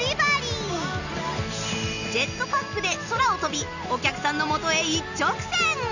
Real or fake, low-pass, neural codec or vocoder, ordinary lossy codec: real; 7.2 kHz; none; none